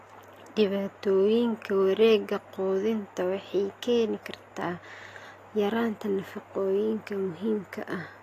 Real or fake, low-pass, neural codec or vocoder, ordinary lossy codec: real; 14.4 kHz; none; AAC, 48 kbps